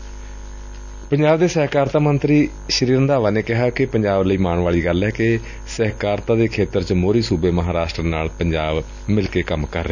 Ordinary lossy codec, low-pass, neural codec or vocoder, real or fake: none; 7.2 kHz; none; real